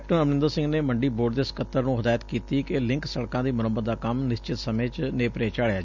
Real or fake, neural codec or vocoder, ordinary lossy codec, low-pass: real; none; none; 7.2 kHz